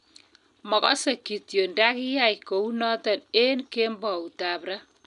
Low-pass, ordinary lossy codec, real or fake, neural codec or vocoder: 10.8 kHz; none; real; none